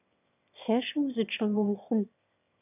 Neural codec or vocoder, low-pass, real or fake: autoencoder, 22.05 kHz, a latent of 192 numbers a frame, VITS, trained on one speaker; 3.6 kHz; fake